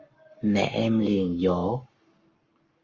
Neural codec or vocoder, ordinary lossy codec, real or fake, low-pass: codec, 44.1 kHz, 7.8 kbps, Pupu-Codec; Opus, 32 kbps; fake; 7.2 kHz